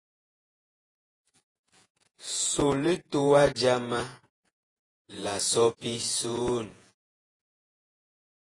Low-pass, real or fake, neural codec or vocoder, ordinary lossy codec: 10.8 kHz; fake; vocoder, 48 kHz, 128 mel bands, Vocos; AAC, 32 kbps